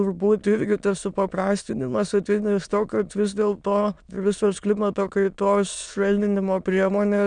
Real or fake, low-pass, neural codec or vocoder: fake; 9.9 kHz; autoencoder, 22.05 kHz, a latent of 192 numbers a frame, VITS, trained on many speakers